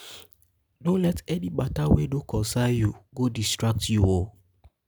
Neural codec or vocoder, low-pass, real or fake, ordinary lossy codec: none; none; real; none